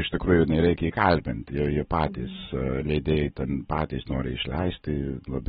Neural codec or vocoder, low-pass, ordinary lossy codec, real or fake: none; 7.2 kHz; AAC, 16 kbps; real